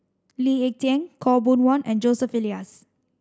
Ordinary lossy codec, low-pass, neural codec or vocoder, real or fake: none; none; none; real